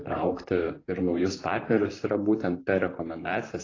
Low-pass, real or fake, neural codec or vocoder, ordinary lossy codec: 7.2 kHz; fake; vocoder, 44.1 kHz, 128 mel bands, Pupu-Vocoder; AAC, 32 kbps